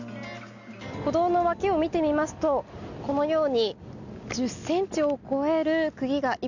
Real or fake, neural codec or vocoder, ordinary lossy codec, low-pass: real; none; none; 7.2 kHz